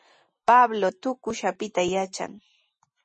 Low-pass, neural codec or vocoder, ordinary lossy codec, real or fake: 10.8 kHz; none; MP3, 32 kbps; real